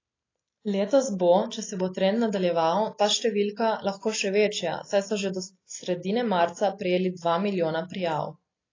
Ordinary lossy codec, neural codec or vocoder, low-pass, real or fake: AAC, 32 kbps; none; 7.2 kHz; real